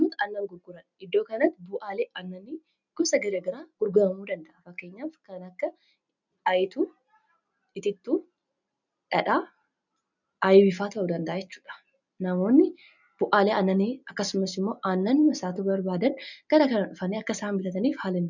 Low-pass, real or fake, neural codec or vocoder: 7.2 kHz; real; none